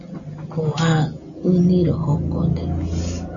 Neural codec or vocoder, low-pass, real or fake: none; 7.2 kHz; real